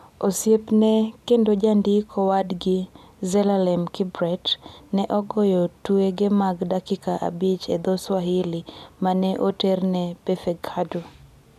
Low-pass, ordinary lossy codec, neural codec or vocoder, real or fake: 14.4 kHz; none; none; real